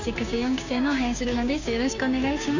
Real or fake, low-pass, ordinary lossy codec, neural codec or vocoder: fake; 7.2 kHz; none; codec, 16 kHz, 6 kbps, DAC